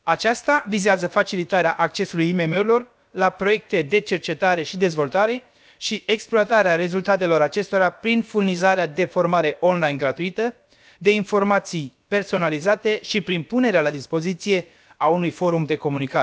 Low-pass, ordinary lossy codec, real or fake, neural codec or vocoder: none; none; fake; codec, 16 kHz, about 1 kbps, DyCAST, with the encoder's durations